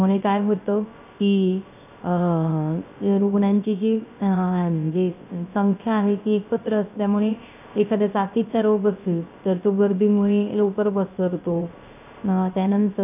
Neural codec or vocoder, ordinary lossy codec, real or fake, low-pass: codec, 16 kHz, 0.3 kbps, FocalCodec; none; fake; 3.6 kHz